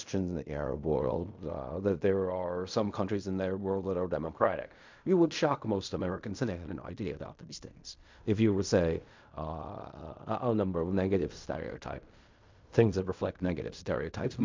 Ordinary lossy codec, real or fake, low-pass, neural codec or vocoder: MP3, 64 kbps; fake; 7.2 kHz; codec, 16 kHz in and 24 kHz out, 0.4 kbps, LongCat-Audio-Codec, fine tuned four codebook decoder